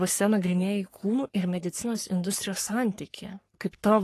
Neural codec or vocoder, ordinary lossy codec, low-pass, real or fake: codec, 44.1 kHz, 3.4 kbps, Pupu-Codec; AAC, 48 kbps; 14.4 kHz; fake